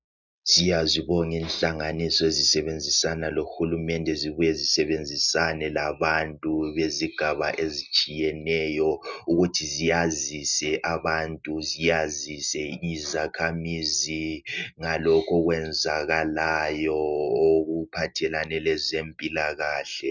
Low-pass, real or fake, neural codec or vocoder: 7.2 kHz; real; none